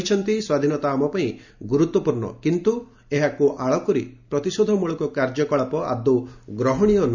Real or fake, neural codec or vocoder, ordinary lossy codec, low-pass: real; none; none; 7.2 kHz